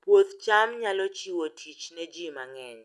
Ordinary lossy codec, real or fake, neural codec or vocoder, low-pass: none; real; none; none